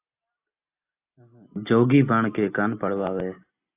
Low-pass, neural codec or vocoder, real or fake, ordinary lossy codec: 3.6 kHz; none; real; AAC, 24 kbps